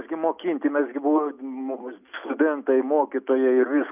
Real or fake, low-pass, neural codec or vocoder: real; 3.6 kHz; none